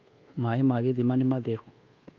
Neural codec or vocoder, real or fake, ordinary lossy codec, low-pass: codec, 24 kHz, 1.2 kbps, DualCodec; fake; Opus, 32 kbps; 7.2 kHz